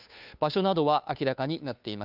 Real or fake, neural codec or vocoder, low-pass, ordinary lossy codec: fake; codec, 16 kHz, 2 kbps, FunCodec, trained on Chinese and English, 25 frames a second; 5.4 kHz; none